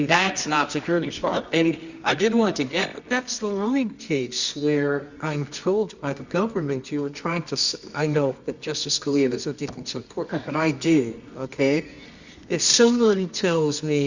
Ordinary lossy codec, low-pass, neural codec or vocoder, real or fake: Opus, 64 kbps; 7.2 kHz; codec, 24 kHz, 0.9 kbps, WavTokenizer, medium music audio release; fake